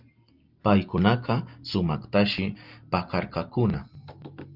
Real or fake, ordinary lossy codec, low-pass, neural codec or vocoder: real; Opus, 24 kbps; 5.4 kHz; none